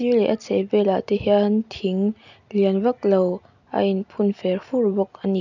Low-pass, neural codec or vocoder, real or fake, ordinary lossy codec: 7.2 kHz; none; real; none